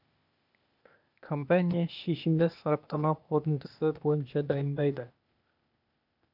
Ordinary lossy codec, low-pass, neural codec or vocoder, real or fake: none; 5.4 kHz; codec, 16 kHz, 0.8 kbps, ZipCodec; fake